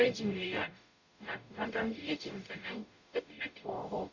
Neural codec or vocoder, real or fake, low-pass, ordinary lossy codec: codec, 44.1 kHz, 0.9 kbps, DAC; fake; 7.2 kHz; none